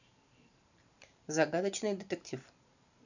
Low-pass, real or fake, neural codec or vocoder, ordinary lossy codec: 7.2 kHz; fake; vocoder, 44.1 kHz, 128 mel bands every 512 samples, BigVGAN v2; none